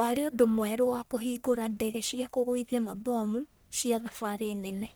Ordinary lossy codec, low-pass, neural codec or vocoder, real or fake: none; none; codec, 44.1 kHz, 1.7 kbps, Pupu-Codec; fake